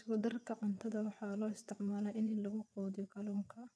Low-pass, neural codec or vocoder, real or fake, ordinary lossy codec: none; vocoder, 22.05 kHz, 80 mel bands, WaveNeXt; fake; none